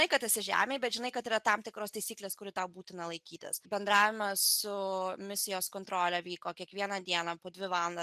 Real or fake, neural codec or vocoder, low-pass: real; none; 14.4 kHz